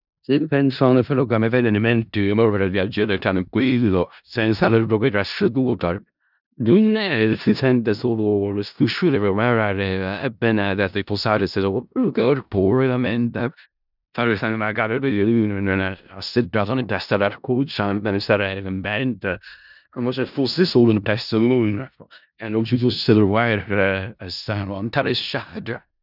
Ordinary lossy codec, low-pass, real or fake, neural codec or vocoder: none; 5.4 kHz; fake; codec, 16 kHz in and 24 kHz out, 0.4 kbps, LongCat-Audio-Codec, four codebook decoder